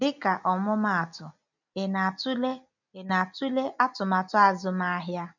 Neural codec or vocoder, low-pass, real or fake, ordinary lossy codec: none; 7.2 kHz; real; none